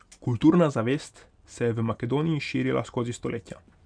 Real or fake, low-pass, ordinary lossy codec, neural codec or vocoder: real; 9.9 kHz; none; none